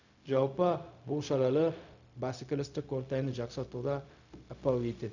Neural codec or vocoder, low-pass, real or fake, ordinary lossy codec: codec, 16 kHz, 0.4 kbps, LongCat-Audio-Codec; 7.2 kHz; fake; none